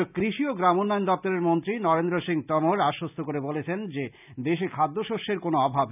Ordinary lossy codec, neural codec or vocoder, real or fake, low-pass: none; none; real; 3.6 kHz